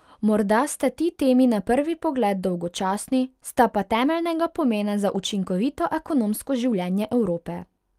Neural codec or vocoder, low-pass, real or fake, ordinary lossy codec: none; 14.4 kHz; real; Opus, 32 kbps